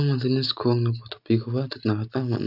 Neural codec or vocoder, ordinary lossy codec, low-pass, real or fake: none; none; 5.4 kHz; real